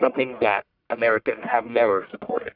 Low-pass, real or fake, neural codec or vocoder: 5.4 kHz; fake; codec, 44.1 kHz, 1.7 kbps, Pupu-Codec